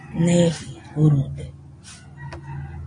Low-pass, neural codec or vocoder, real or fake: 9.9 kHz; none; real